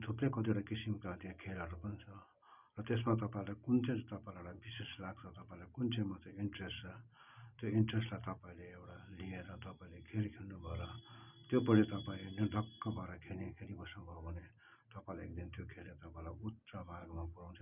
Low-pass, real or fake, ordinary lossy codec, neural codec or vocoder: 3.6 kHz; real; none; none